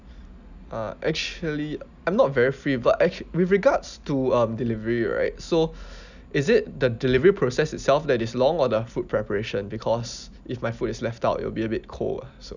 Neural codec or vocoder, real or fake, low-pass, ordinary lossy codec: none; real; 7.2 kHz; none